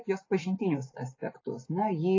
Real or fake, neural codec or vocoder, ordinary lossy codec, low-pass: real; none; AAC, 32 kbps; 7.2 kHz